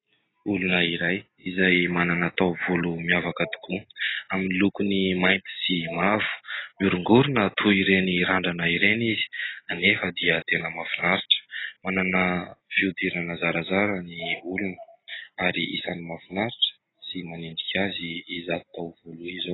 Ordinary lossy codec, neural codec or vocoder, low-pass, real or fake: AAC, 16 kbps; none; 7.2 kHz; real